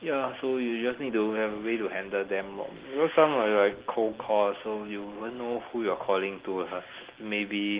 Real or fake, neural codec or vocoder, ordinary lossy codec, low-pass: real; none; Opus, 16 kbps; 3.6 kHz